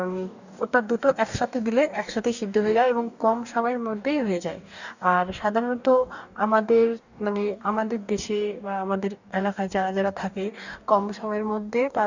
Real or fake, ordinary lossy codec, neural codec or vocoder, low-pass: fake; none; codec, 44.1 kHz, 2.6 kbps, DAC; 7.2 kHz